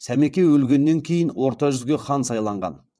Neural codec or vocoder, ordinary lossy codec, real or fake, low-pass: vocoder, 22.05 kHz, 80 mel bands, WaveNeXt; none; fake; none